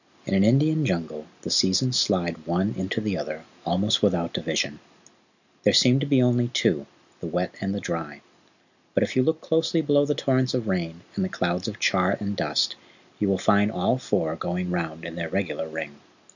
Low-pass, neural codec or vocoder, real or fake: 7.2 kHz; none; real